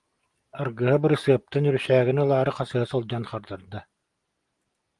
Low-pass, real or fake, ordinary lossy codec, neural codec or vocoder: 10.8 kHz; real; Opus, 24 kbps; none